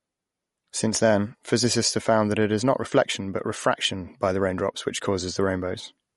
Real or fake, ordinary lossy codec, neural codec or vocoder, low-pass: real; MP3, 48 kbps; none; 19.8 kHz